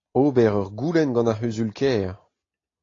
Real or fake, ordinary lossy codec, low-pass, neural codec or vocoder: real; AAC, 32 kbps; 7.2 kHz; none